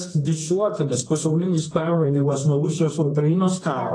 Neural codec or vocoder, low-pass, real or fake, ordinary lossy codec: codec, 24 kHz, 0.9 kbps, WavTokenizer, medium music audio release; 9.9 kHz; fake; AAC, 32 kbps